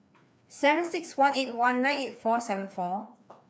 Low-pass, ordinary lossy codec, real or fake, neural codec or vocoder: none; none; fake; codec, 16 kHz, 2 kbps, FreqCodec, larger model